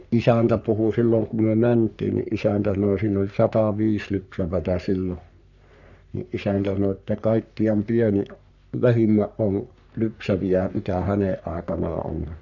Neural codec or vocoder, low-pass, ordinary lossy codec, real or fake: codec, 44.1 kHz, 3.4 kbps, Pupu-Codec; 7.2 kHz; none; fake